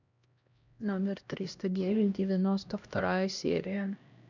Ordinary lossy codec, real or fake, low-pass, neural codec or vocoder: none; fake; 7.2 kHz; codec, 16 kHz, 1 kbps, X-Codec, HuBERT features, trained on LibriSpeech